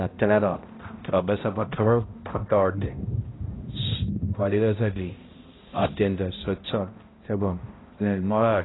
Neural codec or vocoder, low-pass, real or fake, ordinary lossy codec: codec, 16 kHz, 0.5 kbps, X-Codec, HuBERT features, trained on balanced general audio; 7.2 kHz; fake; AAC, 16 kbps